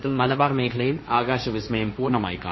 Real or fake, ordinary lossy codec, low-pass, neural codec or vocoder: fake; MP3, 24 kbps; 7.2 kHz; codec, 16 kHz, 1.1 kbps, Voila-Tokenizer